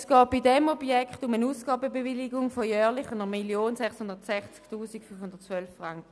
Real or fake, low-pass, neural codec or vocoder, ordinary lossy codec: real; none; none; none